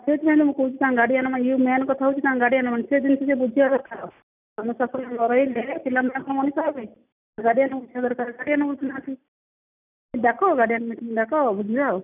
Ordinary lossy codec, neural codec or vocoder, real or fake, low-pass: none; none; real; 3.6 kHz